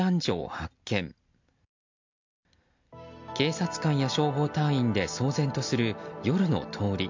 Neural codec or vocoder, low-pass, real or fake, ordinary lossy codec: none; 7.2 kHz; real; MP3, 64 kbps